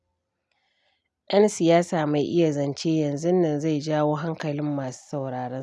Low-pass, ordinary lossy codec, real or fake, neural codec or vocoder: none; none; real; none